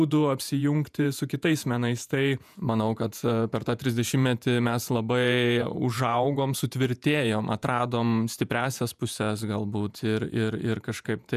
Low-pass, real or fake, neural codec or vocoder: 14.4 kHz; fake; vocoder, 48 kHz, 128 mel bands, Vocos